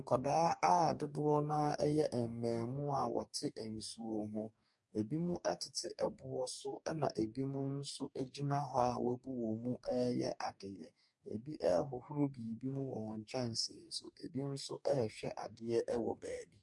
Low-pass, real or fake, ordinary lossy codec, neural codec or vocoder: 10.8 kHz; fake; MP3, 64 kbps; codec, 44.1 kHz, 2.6 kbps, DAC